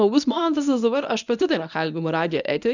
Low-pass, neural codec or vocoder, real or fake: 7.2 kHz; codec, 24 kHz, 0.9 kbps, WavTokenizer, medium speech release version 1; fake